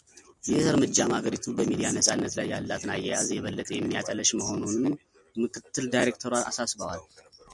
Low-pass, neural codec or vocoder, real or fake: 10.8 kHz; none; real